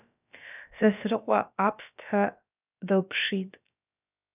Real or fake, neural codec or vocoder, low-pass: fake; codec, 16 kHz, about 1 kbps, DyCAST, with the encoder's durations; 3.6 kHz